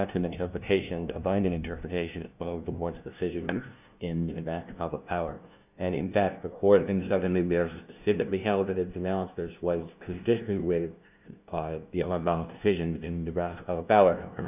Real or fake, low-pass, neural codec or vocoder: fake; 3.6 kHz; codec, 16 kHz, 0.5 kbps, FunCodec, trained on LibriTTS, 25 frames a second